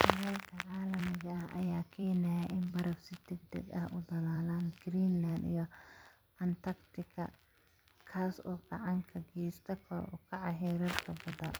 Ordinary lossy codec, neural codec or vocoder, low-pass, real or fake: none; vocoder, 44.1 kHz, 128 mel bands every 256 samples, BigVGAN v2; none; fake